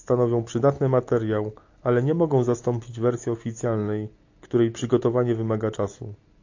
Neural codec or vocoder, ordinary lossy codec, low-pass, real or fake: none; AAC, 48 kbps; 7.2 kHz; real